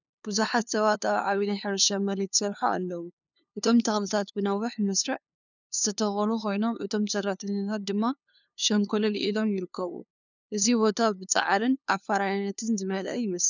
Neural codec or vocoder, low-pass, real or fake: codec, 16 kHz, 2 kbps, FunCodec, trained on LibriTTS, 25 frames a second; 7.2 kHz; fake